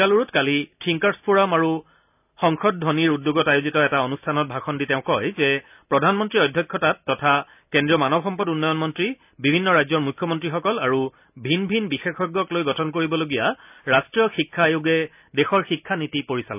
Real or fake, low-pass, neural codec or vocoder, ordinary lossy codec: real; 3.6 kHz; none; none